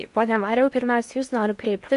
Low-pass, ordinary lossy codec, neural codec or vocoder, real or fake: 10.8 kHz; MP3, 64 kbps; codec, 16 kHz in and 24 kHz out, 0.8 kbps, FocalCodec, streaming, 65536 codes; fake